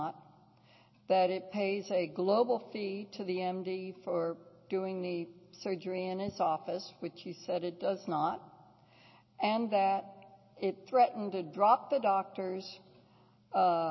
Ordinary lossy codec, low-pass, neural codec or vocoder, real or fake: MP3, 24 kbps; 7.2 kHz; none; real